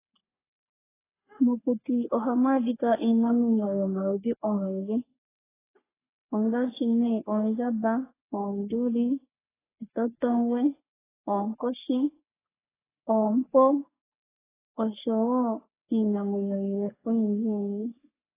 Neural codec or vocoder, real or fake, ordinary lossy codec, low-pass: codec, 44.1 kHz, 3.4 kbps, Pupu-Codec; fake; AAC, 16 kbps; 3.6 kHz